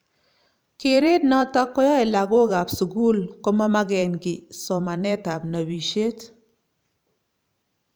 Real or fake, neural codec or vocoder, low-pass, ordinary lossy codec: fake; vocoder, 44.1 kHz, 128 mel bands every 512 samples, BigVGAN v2; none; none